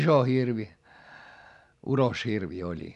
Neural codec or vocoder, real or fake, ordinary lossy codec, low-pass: none; real; none; 10.8 kHz